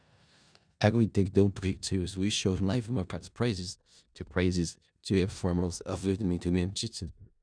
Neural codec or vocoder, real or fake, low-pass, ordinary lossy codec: codec, 16 kHz in and 24 kHz out, 0.4 kbps, LongCat-Audio-Codec, four codebook decoder; fake; 9.9 kHz; none